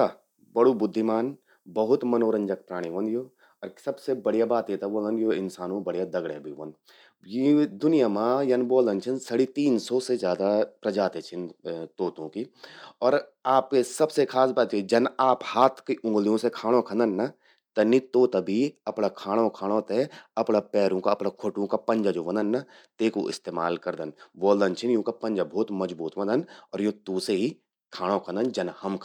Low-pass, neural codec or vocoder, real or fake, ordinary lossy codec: 19.8 kHz; none; real; none